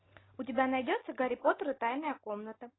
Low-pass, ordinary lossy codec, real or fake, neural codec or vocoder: 7.2 kHz; AAC, 16 kbps; real; none